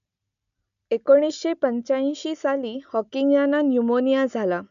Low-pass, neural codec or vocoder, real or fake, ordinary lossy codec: 7.2 kHz; none; real; none